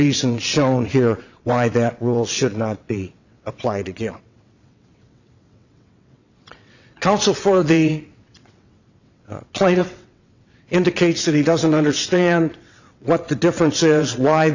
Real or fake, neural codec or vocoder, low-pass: fake; vocoder, 22.05 kHz, 80 mel bands, WaveNeXt; 7.2 kHz